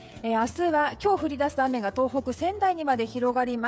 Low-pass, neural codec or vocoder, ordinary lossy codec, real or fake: none; codec, 16 kHz, 8 kbps, FreqCodec, smaller model; none; fake